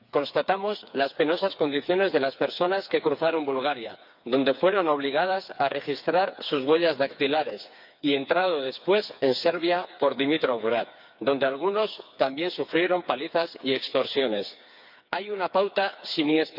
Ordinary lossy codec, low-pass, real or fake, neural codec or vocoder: none; 5.4 kHz; fake; codec, 16 kHz, 4 kbps, FreqCodec, smaller model